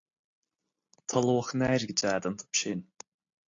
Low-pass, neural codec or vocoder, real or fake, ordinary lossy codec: 7.2 kHz; none; real; AAC, 48 kbps